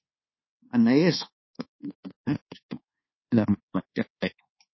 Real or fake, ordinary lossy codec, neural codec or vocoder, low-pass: fake; MP3, 24 kbps; codec, 24 kHz, 1.2 kbps, DualCodec; 7.2 kHz